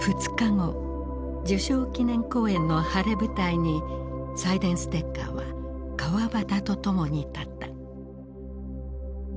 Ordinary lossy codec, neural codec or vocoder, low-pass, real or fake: none; none; none; real